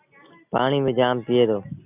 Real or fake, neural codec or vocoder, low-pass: real; none; 3.6 kHz